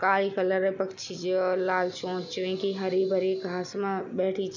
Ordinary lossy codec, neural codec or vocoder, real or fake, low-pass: none; none; real; 7.2 kHz